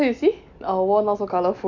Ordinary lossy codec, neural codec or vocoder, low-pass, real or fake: MP3, 64 kbps; none; 7.2 kHz; real